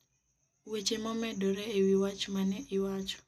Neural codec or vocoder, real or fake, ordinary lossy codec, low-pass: none; real; none; 14.4 kHz